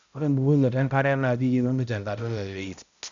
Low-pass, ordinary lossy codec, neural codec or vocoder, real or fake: 7.2 kHz; none; codec, 16 kHz, 0.5 kbps, X-Codec, HuBERT features, trained on balanced general audio; fake